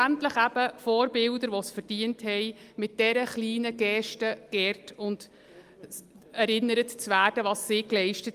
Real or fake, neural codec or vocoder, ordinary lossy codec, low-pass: real; none; Opus, 32 kbps; 14.4 kHz